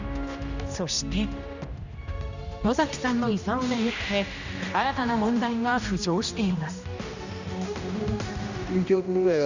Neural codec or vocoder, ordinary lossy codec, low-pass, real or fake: codec, 16 kHz, 1 kbps, X-Codec, HuBERT features, trained on general audio; none; 7.2 kHz; fake